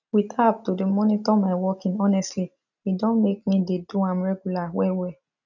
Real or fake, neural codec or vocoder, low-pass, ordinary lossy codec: real; none; 7.2 kHz; none